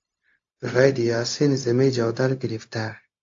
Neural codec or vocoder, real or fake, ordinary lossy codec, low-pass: codec, 16 kHz, 0.4 kbps, LongCat-Audio-Codec; fake; AAC, 48 kbps; 7.2 kHz